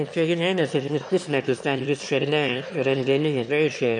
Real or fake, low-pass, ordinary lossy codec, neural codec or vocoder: fake; 9.9 kHz; MP3, 48 kbps; autoencoder, 22.05 kHz, a latent of 192 numbers a frame, VITS, trained on one speaker